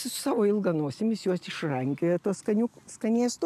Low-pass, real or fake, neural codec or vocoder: 14.4 kHz; real; none